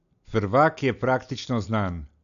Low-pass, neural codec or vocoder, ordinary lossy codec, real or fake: 7.2 kHz; none; none; real